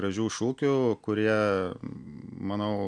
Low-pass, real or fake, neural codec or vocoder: 9.9 kHz; real; none